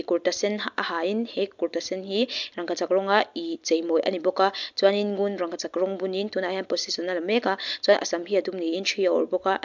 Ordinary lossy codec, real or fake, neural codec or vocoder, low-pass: MP3, 64 kbps; real; none; 7.2 kHz